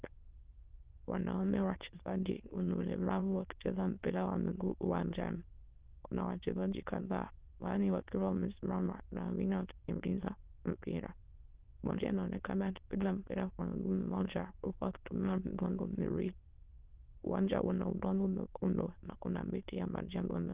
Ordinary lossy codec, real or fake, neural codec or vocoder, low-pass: Opus, 16 kbps; fake; autoencoder, 22.05 kHz, a latent of 192 numbers a frame, VITS, trained on many speakers; 3.6 kHz